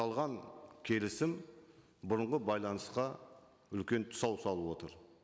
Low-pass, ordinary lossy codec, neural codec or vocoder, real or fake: none; none; none; real